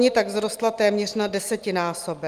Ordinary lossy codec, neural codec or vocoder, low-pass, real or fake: Opus, 24 kbps; none; 14.4 kHz; real